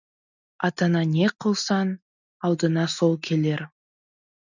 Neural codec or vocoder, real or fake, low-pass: none; real; 7.2 kHz